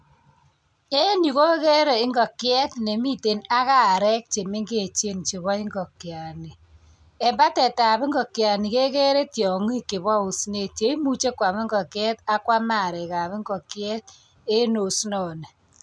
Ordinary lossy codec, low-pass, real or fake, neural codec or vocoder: none; 9.9 kHz; real; none